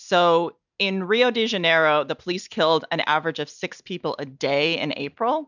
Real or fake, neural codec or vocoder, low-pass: real; none; 7.2 kHz